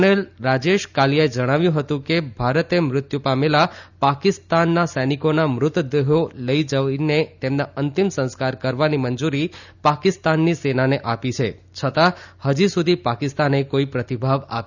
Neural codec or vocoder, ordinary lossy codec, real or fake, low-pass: none; none; real; 7.2 kHz